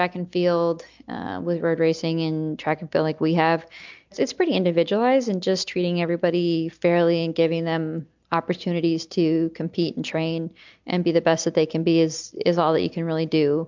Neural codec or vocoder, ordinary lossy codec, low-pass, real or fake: none; MP3, 64 kbps; 7.2 kHz; real